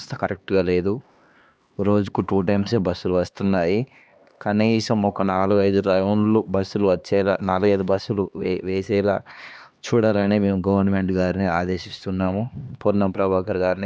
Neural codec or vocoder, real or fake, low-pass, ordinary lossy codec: codec, 16 kHz, 2 kbps, X-Codec, HuBERT features, trained on LibriSpeech; fake; none; none